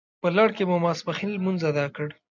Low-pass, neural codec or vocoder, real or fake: 7.2 kHz; none; real